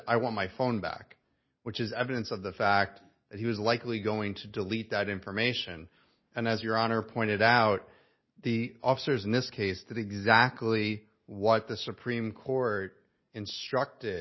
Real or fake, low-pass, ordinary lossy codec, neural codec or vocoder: real; 7.2 kHz; MP3, 24 kbps; none